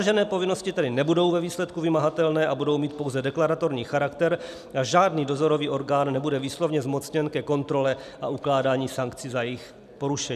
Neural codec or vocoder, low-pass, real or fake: none; 14.4 kHz; real